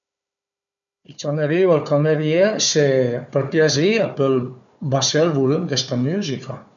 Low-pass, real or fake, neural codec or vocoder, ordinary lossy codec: 7.2 kHz; fake; codec, 16 kHz, 4 kbps, FunCodec, trained on Chinese and English, 50 frames a second; none